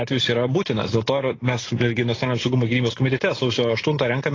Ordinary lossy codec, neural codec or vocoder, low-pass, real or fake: AAC, 32 kbps; none; 7.2 kHz; real